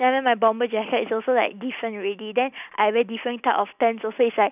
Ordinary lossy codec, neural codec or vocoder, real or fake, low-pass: none; none; real; 3.6 kHz